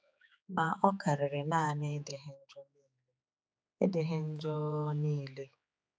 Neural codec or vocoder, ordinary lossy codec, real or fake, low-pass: codec, 16 kHz, 4 kbps, X-Codec, HuBERT features, trained on general audio; none; fake; none